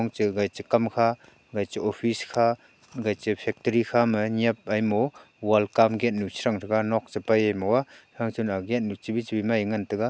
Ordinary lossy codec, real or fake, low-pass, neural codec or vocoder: none; real; none; none